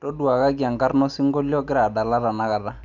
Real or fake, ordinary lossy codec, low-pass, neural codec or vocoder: real; none; 7.2 kHz; none